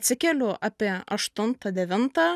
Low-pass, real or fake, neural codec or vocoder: 14.4 kHz; real; none